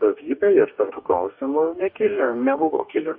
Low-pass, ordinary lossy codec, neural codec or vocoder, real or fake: 5.4 kHz; MP3, 32 kbps; codec, 44.1 kHz, 2.6 kbps, DAC; fake